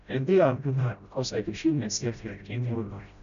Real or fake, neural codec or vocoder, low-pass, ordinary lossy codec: fake; codec, 16 kHz, 0.5 kbps, FreqCodec, smaller model; 7.2 kHz; none